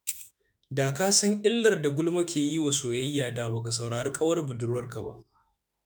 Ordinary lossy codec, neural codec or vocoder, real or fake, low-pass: none; autoencoder, 48 kHz, 32 numbers a frame, DAC-VAE, trained on Japanese speech; fake; none